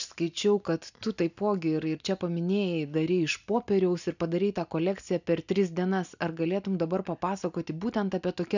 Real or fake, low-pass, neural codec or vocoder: real; 7.2 kHz; none